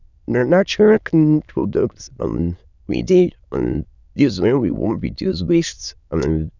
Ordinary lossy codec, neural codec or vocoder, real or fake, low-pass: none; autoencoder, 22.05 kHz, a latent of 192 numbers a frame, VITS, trained on many speakers; fake; 7.2 kHz